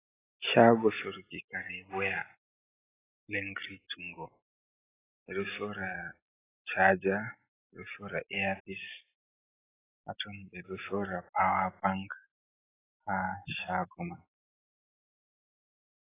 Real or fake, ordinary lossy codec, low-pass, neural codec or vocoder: real; AAC, 16 kbps; 3.6 kHz; none